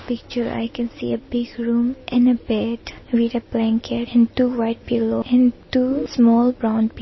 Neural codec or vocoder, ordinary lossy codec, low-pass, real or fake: none; MP3, 24 kbps; 7.2 kHz; real